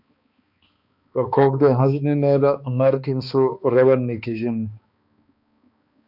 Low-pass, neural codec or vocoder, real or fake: 5.4 kHz; codec, 16 kHz, 2 kbps, X-Codec, HuBERT features, trained on balanced general audio; fake